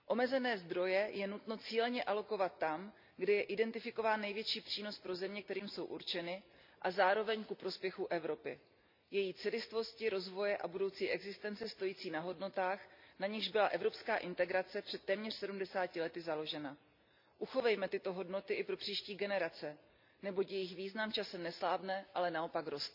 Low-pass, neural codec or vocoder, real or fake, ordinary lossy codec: 5.4 kHz; none; real; MP3, 48 kbps